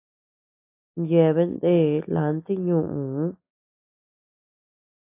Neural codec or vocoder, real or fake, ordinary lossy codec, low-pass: none; real; AAC, 24 kbps; 3.6 kHz